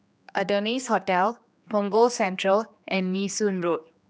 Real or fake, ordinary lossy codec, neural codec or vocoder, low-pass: fake; none; codec, 16 kHz, 2 kbps, X-Codec, HuBERT features, trained on general audio; none